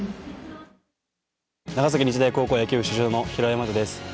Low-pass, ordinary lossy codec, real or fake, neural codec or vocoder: none; none; real; none